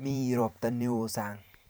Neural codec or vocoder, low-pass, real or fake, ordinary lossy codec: vocoder, 44.1 kHz, 128 mel bands every 512 samples, BigVGAN v2; none; fake; none